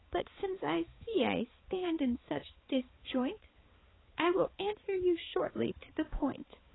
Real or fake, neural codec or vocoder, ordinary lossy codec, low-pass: fake; codec, 16 kHz, 4 kbps, X-Codec, HuBERT features, trained on balanced general audio; AAC, 16 kbps; 7.2 kHz